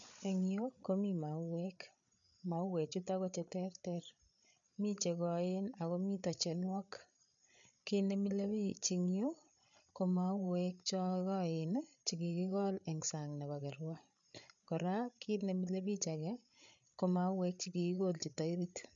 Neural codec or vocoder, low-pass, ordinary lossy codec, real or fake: codec, 16 kHz, 8 kbps, FreqCodec, larger model; 7.2 kHz; none; fake